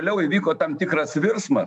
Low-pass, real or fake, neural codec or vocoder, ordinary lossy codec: 10.8 kHz; fake; autoencoder, 48 kHz, 128 numbers a frame, DAC-VAE, trained on Japanese speech; MP3, 96 kbps